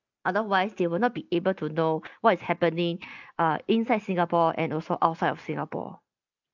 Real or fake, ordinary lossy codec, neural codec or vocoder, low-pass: real; none; none; 7.2 kHz